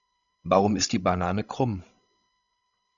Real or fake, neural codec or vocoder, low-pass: fake; codec, 16 kHz, 16 kbps, FreqCodec, larger model; 7.2 kHz